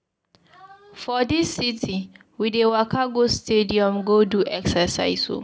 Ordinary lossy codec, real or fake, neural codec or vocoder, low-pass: none; real; none; none